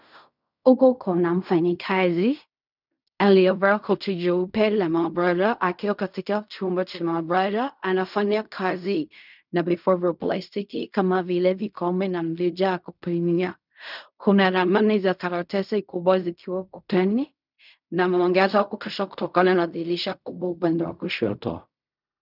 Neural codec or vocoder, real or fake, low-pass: codec, 16 kHz in and 24 kHz out, 0.4 kbps, LongCat-Audio-Codec, fine tuned four codebook decoder; fake; 5.4 kHz